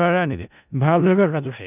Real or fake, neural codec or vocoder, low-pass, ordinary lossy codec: fake; codec, 16 kHz in and 24 kHz out, 0.4 kbps, LongCat-Audio-Codec, four codebook decoder; 3.6 kHz; none